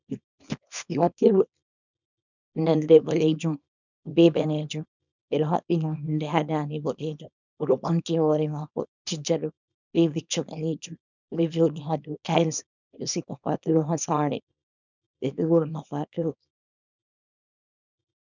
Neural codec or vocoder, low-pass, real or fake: codec, 24 kHz, 0.9 kbps, WavTokenizer, small release; 7.2 kHz; fake